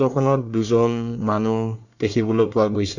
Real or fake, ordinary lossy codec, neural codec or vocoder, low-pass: fake; none; codec, 24 kHz, 1 kbps, SNAC; 7.2 kHz